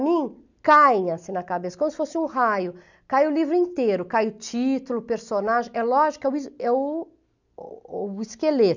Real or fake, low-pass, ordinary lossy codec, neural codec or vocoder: real; 7.2 kHz; none; none